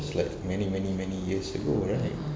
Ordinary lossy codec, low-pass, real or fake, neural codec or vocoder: none; none; real; none